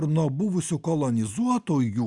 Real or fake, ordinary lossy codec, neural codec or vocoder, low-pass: real; Opus, 64 kbps; none; 10.8 kHz